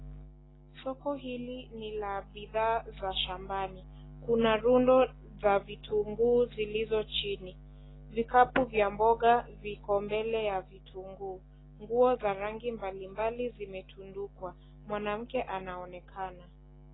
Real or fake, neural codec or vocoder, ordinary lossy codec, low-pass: real; none; AAC, 16 kbps; 7.2 kHz